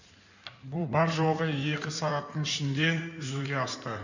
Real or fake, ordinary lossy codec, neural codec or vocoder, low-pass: fake; none; codec, 16 kHz in and 24 kHz out, 2.2 kbps, FireRedTTS-2 codec; 7.2 kHz